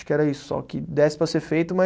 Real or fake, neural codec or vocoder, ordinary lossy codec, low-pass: real; none; none; none